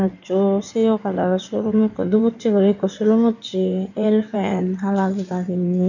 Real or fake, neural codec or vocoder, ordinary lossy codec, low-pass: fake; codec, 16 kHz in and 24 kHz out, 2.2 kbps, FireRedTTS-2 codec; none; 7.2 kHz